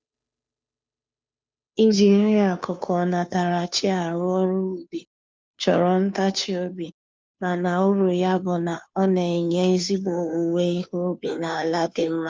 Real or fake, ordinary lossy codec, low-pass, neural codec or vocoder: fake; none; none; codec, 16 kHz, 2 kbps, FunCodec, trained on Chinese and English, 25 frames a second